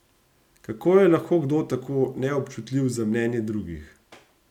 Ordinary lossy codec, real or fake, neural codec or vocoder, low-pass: none; real; none; 19.8 kHz